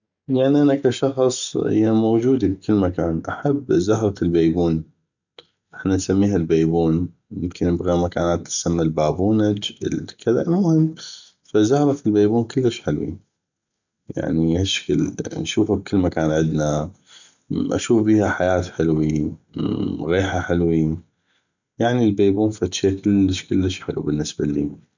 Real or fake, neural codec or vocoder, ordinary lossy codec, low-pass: real; none; none; 7.2 kHz